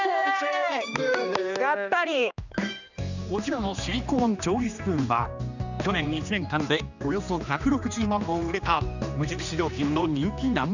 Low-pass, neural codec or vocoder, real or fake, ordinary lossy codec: 7.2 kHz; codec, 16 kHz, 2 kbps, X-Codec, HuBERT features, trained on general audio; fake; none